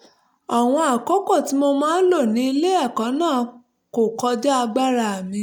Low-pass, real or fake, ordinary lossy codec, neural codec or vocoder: 19.8 kHz; real; none; none